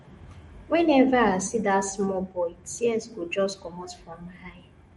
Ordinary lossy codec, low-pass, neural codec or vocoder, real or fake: MP3, 48 kbps; 19.8 kHz; autoencoder, 48 kHz, 128 numbers a frame, DAC-VAE, trained on Japanese speech; fake